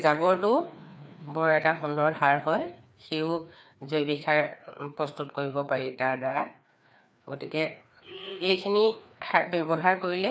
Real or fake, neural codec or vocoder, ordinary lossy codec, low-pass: fake; codec, 16 kHz, 2 kbps, FreqCodec, larger model; none; none